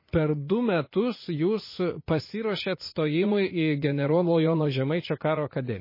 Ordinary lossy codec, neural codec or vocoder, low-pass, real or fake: MP3, 24 kbps; vocoder, 44.1 kHz, 80 mel bands, Vocos; 5.4 kHz; fake